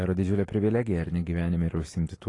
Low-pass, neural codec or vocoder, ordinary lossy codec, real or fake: 10.8 kHz; none; AAC, 32 kbps; real